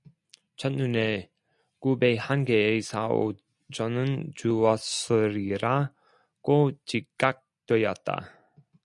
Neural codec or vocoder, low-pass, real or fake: none; 10.8 kHz; real